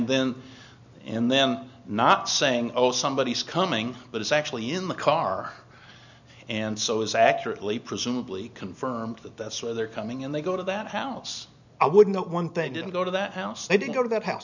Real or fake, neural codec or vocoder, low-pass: real; none; 7.2 kHz